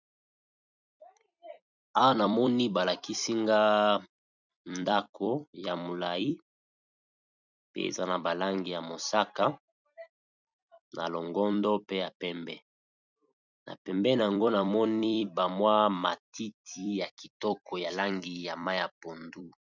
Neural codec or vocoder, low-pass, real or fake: vocoder, 44.1 kHz, 128 mel bands every 256 samples, BigVGAN v2; 7.2 kHz; fake